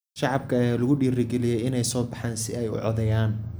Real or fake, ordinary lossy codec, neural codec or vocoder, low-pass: real; none; none; none